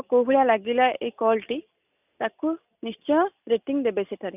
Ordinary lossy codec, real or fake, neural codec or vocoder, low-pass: none; real; none; 3.6 kHz